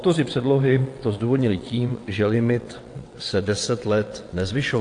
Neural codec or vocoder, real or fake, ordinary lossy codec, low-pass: vocoder, 22.05 kHz, 80 mel bands, WaveNeXt; fake; AAC, 48 kbps; 9.9 kHz